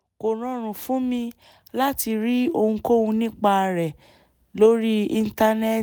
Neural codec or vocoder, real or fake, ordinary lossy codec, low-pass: none; real; none; none